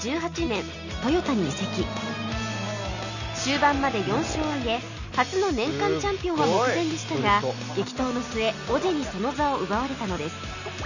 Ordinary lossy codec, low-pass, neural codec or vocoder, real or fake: none; 7.2 kHz; none; real